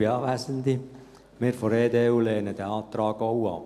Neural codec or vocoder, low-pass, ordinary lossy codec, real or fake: none; 14.4 kHz; none; real